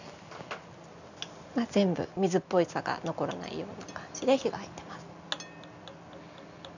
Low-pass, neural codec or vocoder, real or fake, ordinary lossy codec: 7.2 kHz; none; real; none